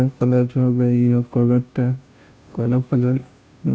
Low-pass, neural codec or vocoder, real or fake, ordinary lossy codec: none; codec, 16 kHz, 0.5 kbps, FunCodec, trained on Chinese and English, 25 frames a second; fake; none